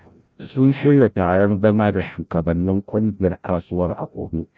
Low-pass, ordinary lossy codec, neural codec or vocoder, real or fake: none; none; codec, 16 kHz, 0.5 kbps, FreqCodec, larger model; fake